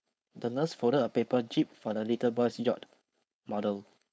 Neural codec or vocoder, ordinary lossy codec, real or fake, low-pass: codec, 16 kHz, 4.8 kbps, FACodec; none; fake; none